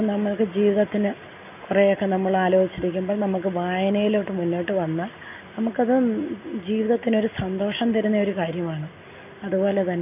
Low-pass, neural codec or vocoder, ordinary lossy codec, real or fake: 3.6 kHz; none; none; real